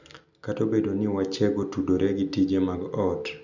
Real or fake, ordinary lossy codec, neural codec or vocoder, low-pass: real; none; none; 7.2 kHz